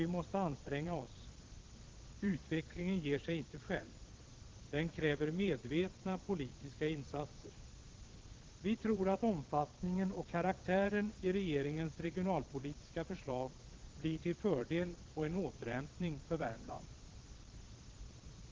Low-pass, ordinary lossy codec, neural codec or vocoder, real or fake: 7.2 kHz; Opus, 16 kbps; vocoder, 22.05 kHz, 80 mel bands, Vocos; fake